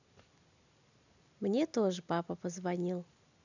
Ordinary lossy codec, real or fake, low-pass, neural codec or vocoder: none; real; 7.2 kHz; none